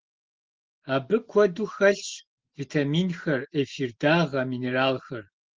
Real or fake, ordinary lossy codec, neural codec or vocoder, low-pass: real; Opus, 16 kbps; none; 7.2 kHz